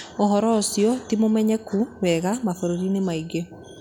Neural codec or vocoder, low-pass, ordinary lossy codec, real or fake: none; 19.8 kHz; none; real